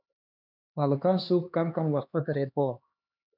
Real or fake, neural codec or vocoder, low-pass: fake; codec, 16 kHz, 2 kbps, X-Codec, HuBERT features, trained on LibriSpeech; 5.4 kHz